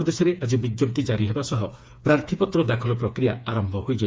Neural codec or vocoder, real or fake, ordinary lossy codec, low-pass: codec, 16 kHz, 4 kbps, FreqCodec, smaller model; fake; none; none